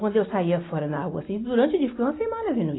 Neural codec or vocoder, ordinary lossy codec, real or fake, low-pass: none; AAC, 16 kbps; real; 7.2 kHz